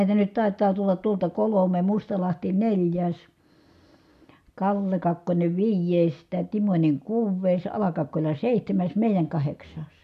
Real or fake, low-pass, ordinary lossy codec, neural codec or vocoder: real; 14.4 kHz; none; none